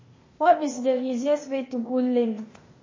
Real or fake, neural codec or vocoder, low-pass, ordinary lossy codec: fake; codec, 16 kHz, 0.8 kbps, ZipCodec; 7.2 kHz; MP3, 32 kbps